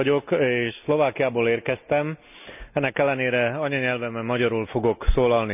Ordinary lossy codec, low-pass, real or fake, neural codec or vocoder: none; 3.6 kHz; real; none